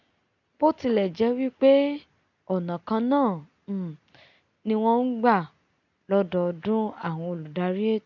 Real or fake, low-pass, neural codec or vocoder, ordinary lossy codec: real; 7.2 kHz; none; none